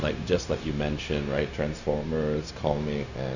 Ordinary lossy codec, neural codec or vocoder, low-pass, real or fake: none; codec, 16 kHz, 0.4 kbps, LongCat-Audio-Codec; 7.2 kHz; fake